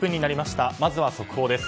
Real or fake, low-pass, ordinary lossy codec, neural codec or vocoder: real; none; none; none